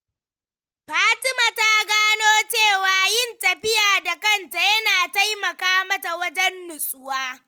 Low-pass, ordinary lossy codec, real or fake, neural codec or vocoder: 19.8 kHz; Opus, 24 kbps; real; none